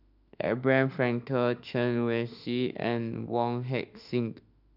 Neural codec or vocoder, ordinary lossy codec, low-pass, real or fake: autoencoder, 48 kHz, 32 numbers a frame, DAC-VAE, trained on Japanese speech; none; 5.4 kHz; fake